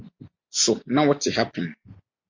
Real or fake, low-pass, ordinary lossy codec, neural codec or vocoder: real; 7.2 kHz; MP3, 64 kbps; none